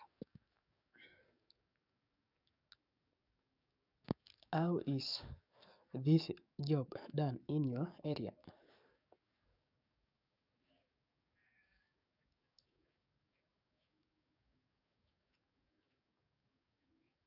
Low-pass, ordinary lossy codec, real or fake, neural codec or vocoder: 5.4 kHz; none; fake; codec, 44.1 kHz, 7.8 kbps, DAC